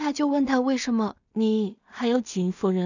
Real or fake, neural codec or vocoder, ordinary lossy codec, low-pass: fake; codec, 16 kHz in and 24 kHz out, 0.4 kbps, LongCat-Audio-Codec, two codebook decoder; none; 7.2 kHz